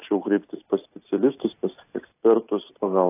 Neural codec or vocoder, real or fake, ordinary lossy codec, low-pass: none; real; AAC, 24 kbps; 3.6 kHz